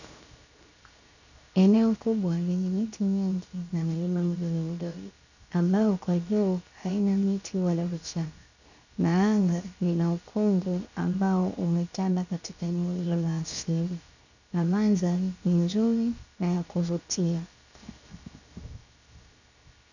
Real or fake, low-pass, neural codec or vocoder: fake; 7.2 kHz; codec, 16 kHz, 0.7 kbps, FocalCodec